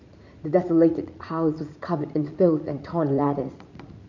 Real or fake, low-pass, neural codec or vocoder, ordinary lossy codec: fake; 7.2 kHz; vocoder, 44.1 kHz, 80 mel bands, Vocos; none